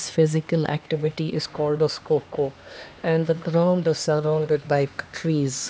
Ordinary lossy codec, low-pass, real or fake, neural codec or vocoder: none; none; fake; codec, 16 kHz, 2 kbps, X-Codec, HuBERT features, trained on LibriSpeech